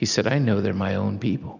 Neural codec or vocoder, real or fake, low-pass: none; real; 7.2 kHz